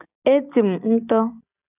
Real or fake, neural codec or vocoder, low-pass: real; none; 3.6 kHz